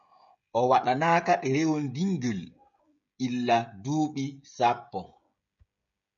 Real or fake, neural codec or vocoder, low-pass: fake; codec, 16 kHz, 16 kbps, FreqCodec, smaller model; 7.2 kHz